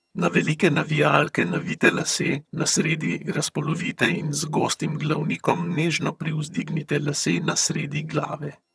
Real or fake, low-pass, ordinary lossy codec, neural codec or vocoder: fake; none; none; vocoder, 22.05 kHz, 80 mel bands, HiFi-GAN